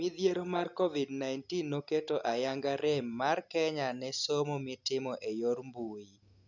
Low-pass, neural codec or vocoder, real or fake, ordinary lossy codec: 7.2 kHz; none; real; none